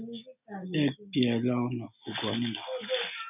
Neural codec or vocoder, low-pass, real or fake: none; 3.6 kHz; real